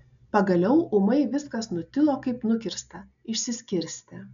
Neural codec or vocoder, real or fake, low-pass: none; real; 7.2 kHz